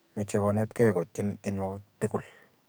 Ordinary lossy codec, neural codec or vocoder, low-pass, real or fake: none; codec, 44.1 kHz, 2.6 kbps, SNAC; none; fake